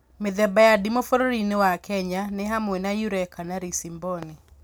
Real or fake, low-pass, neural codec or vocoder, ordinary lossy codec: real; none; none; none